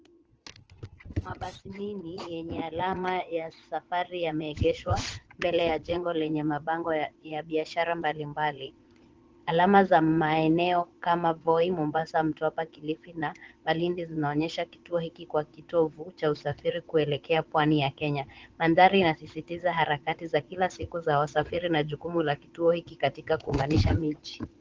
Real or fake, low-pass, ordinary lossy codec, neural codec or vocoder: fake; 7.2 kHz; Opus, 16 kbps; vocoder, 22.05 kHz, 80 mel bands, Vocos